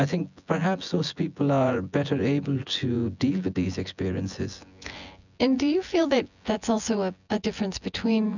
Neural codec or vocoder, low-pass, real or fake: vocoder, 24 kHz, 100 mel bands, Vocos; 7.2 kHz; fake